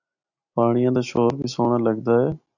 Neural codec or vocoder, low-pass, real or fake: none; 7.2 kHz; real